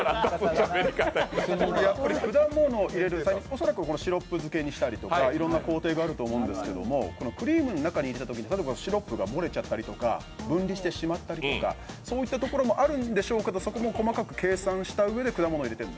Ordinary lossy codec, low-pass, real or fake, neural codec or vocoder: none; none; real; none